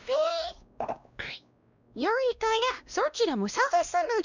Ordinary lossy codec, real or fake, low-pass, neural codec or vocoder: none; fake; 7.2 kHz; codec, 16 kHz, 1 kbps, X-Codec, WavLM features, trained on Multilingual LibriSpeech